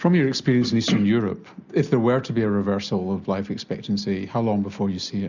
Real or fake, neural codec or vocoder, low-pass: real; none; 7.2 kHz